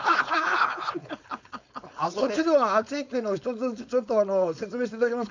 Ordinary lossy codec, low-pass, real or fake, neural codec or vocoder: MP3, 64 kbps; 7.2 kHz; fake; codec, 16 kHz, 4.8 kbps, FACodec